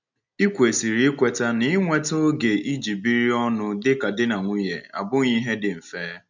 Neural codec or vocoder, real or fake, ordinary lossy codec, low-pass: none; real; none; 7.2 kHz